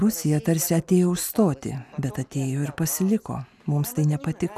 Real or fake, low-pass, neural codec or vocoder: real; 14.4 kHz; none